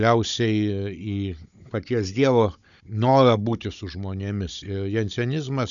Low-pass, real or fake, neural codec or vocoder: 7.2 kHz; fake; codec, 16 kHz, 16 kbps, FunCodec, trained on Chinese and English, 50 frames a second